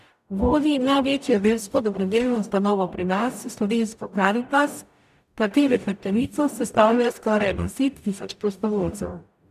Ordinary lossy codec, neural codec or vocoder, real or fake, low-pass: none; codec, 44.1 kHz, 0.9 kbps, DAC; fake; 14.4 kHz